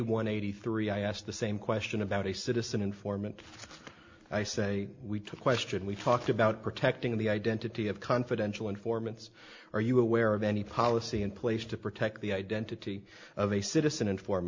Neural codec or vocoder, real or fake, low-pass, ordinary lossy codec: none; real; 7.2 kHz; MP3, 48 kbps